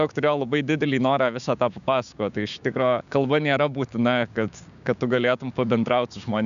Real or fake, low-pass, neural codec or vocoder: fake; 7.2 kHz; codec, 16 kHz, 6 kbps, DAC